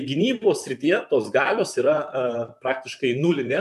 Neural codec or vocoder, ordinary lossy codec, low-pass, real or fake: vocoder, 44.1 kHz, 128 mel bands, Pupu-Vocoder; MP3, 96 kbps; 14.4 kHz; fake